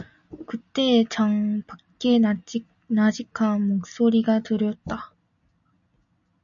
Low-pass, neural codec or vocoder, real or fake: 7.2 kHz; none; real